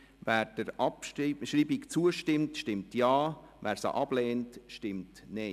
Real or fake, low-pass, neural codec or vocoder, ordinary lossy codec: real; 14.4 kHz; none; none